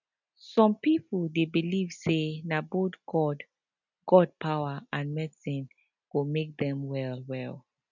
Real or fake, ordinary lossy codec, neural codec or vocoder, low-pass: real; none; none; 7.2 kHz